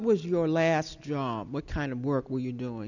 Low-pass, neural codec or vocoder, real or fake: 7.2 kHz; none; real